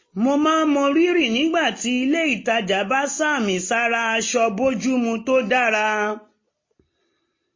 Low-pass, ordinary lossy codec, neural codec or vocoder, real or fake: 7.2 kHz; MP3, 32 kbps; none; real